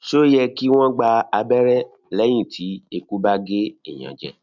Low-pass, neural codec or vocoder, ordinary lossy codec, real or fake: 7.2 kHz; none; none; real